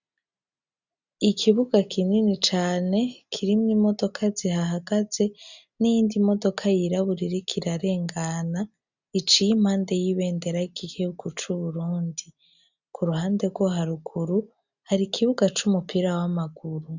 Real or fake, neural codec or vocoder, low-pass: real; none; 7.2 kHz